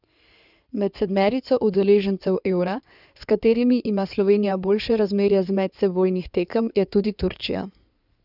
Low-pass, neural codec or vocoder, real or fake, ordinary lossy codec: 5.4 kHz; codec, 16 kHz in and 24 kHz out, 2.2 kbps, FireRedTTS-2 codec; fake; none